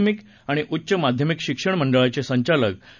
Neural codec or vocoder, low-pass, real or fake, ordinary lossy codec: none; 7.2 kHz; real; none